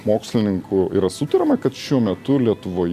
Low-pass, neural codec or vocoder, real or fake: 14.4 kHz; none; real